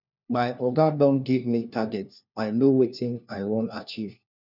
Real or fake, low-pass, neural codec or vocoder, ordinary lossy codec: fake; 5.4 kHz; codec, 16 kHz, 1 kbps, FunCodec, trained on LibriTTS, 50 frames a second; none